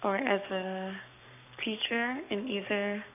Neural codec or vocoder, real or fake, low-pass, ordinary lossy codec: codec, 44.1 kHz, 7.8 kbps, Pupu-Codec; fake; 3.6 kHz; none